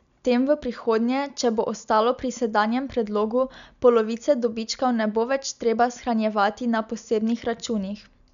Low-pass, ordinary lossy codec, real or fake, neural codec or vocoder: 7.2 kHz; none; real; none